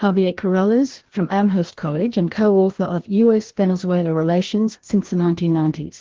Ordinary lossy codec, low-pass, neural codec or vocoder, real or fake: Opus, 16 kbps; 7.2 kHz; codec, 16 kHz, 1 kbps, FreqCodec, larger model; fake